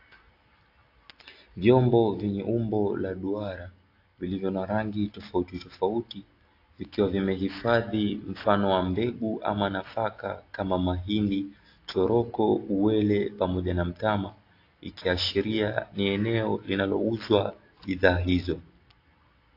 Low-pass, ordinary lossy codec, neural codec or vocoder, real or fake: 5.4 kHz; AAC, 32 kbps; none; real